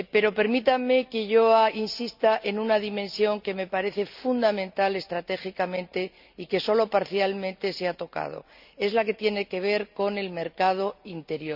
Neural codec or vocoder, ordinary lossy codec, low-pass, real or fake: none; none; 5.4 kHz; real